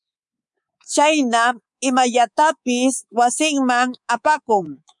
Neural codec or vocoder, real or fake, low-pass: codec, 24 kHz, 3.1 kbps, DualCodec; fake; 10.8 kHz